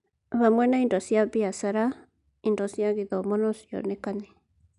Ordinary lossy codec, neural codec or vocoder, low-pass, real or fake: none; none; 10.8 kHz; real